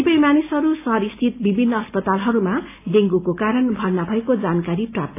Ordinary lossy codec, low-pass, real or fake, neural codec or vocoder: AAC, 24 kbps; 3.6 kHz; real; none